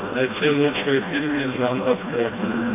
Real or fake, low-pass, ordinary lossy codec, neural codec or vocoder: fake; 3.6 kHz; AAC, 24 kbps; codec, 16 kHz, 1 kbps, FreqCodec, smaller model